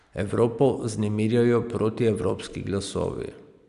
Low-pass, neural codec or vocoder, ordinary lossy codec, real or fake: 10.8 kHz; none; Opus, 64 kbps; real